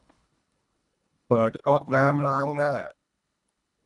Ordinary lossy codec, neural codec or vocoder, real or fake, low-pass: none; codec, 24 kHz, 1.5 kbps, HILCodec; fake; 10.8 kHz